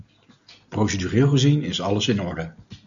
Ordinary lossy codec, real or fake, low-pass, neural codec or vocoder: MP3, 96 kbps; real; 7.2 kHz; none